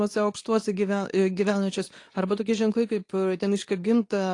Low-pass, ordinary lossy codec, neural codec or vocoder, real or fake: 10.8 kHz; AAC, 48 kbps; codec, 24 kHz, 0.9 kbps, WavTokenizer, medium speech release version 2; fake